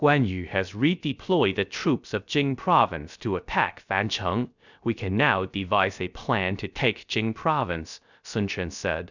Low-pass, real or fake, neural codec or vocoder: 7.2 kHz; fake; codec, 16 kHz, 0.3 kbps, FocalCodec